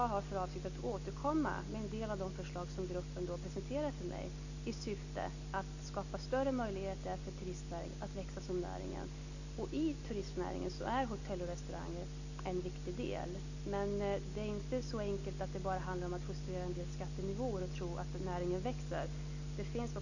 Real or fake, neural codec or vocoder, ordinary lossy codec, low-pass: real; none; none; 7.2 kHz